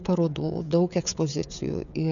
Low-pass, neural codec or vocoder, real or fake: 7.2 kHz; codec, 16 kHz, 16 kbps, FreqCodec, smaller model; fake